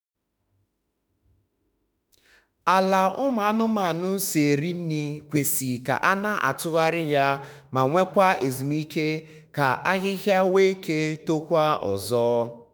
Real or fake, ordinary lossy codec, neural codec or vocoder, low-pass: fake; none; autoencoder, 48 kHz, 32 numbers a frame, DAC-VAE, trained on Japanese speech; none